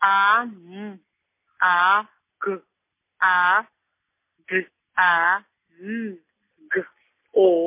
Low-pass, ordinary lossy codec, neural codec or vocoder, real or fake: 3.6 kHz; MP3, 24 kbps; none; real